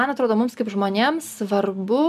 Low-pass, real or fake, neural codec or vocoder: 14.4 kHz; real; none